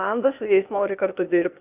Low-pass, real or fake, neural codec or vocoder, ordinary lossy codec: 3.6 kHz; fake; codec, 16 kHz, 0.8 kbps, ZipCodec; Opus, 64 kbps